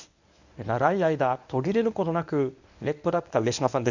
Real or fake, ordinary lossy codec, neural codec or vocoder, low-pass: fake; none; codec, 24 kHz, 0.9 kbps, WavTokenizer, medium speech release version 1; 7.2 kHz